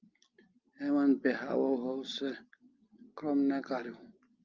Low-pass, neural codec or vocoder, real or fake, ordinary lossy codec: 7.2 kHz; none; real; Opus, 24 kbps